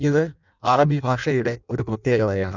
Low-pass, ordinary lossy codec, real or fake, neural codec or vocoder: 7.2 kHz; none; fake; codec, 16 kHz in and 24 kHz out, 0.6 kbps, FireRedTTS-2 codec